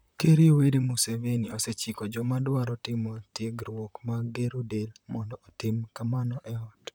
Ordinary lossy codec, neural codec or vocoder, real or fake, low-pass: none; vocoder, 44.1 kHz, 128 mel bands, Pupu-Vocoder; fake; none